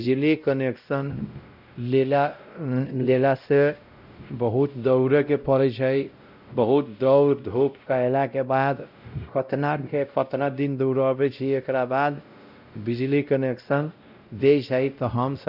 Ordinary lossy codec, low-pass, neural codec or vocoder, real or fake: none; 5.4 kHz; codec, 16 kHz, 0.5 kbps, X-Codec, WavLM features, trained on Multilingual LibriSpeech; fake